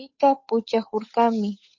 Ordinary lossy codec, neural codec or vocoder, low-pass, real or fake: MP3, 32 kbps; codec, 16 kHz, 8 kbps, FunCodec, trained on Chinese and English, 25 frames a second; 7.2 kHz; fake